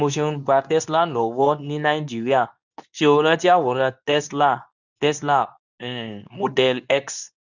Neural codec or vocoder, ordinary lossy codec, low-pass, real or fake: codec, 24 kHz, 0.9 kbps, WavTokenizer, medium speech release version 2; none; 7.2 kHz; fake